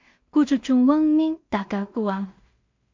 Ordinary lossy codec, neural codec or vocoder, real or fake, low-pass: MP3, 48 kbps; codec, 16 kHz in and 24 kHz out, 0.4 kbps, LongCat-Audio-Codec, two codebook decoder; fake; 7.2 kHz